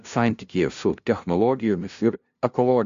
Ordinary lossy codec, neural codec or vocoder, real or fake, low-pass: AAC, 64 kbps; codec, 16 kHz, 0.5 kbps, FunCodec, trained on LibriTTS, 25 frames a second; fake; 7.2 kHz